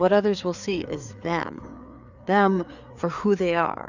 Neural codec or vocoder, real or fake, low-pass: codec, 16 kHz, 4 kbps, FreqCodec, larger model; fake; 7.2 kHz